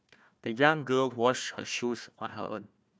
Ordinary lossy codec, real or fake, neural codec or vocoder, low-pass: none; fake; codec, 16 kHz, 1 kbps, FunCodec, trained on Chinese and English, 50 frames a second; none